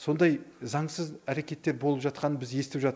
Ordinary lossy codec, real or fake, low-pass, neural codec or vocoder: none; real; none; none